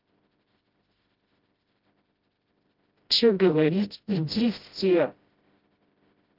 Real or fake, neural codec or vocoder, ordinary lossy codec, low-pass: fake; codec, 16 kHz, 0.5 kbps, FreqCodec, smaller model; Opus, 32 kbps; 5.4 kHz